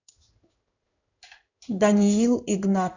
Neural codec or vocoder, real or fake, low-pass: codec, 16 kHz in and 24 kHz out, 1 kbps, XY-Tokenizer; fake; 7.2 kHz